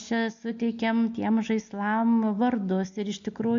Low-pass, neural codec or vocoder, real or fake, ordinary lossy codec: 7.2 kHz; none; real; MP3, 64 kbps